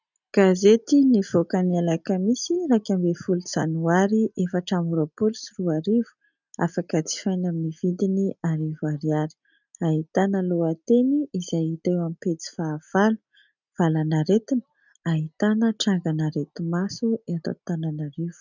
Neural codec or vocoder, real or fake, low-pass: none; real; 7.2 kHz